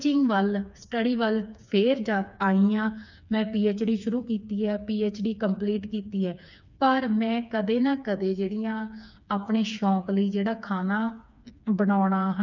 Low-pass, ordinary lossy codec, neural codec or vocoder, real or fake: 7.2 kHz; none; codec, 16 kHz, 4 kbps, FreqCodec, smaller model; fake